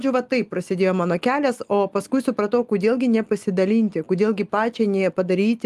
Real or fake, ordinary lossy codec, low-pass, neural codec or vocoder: real; Opus, 32 kbps; 14.4 kHz; none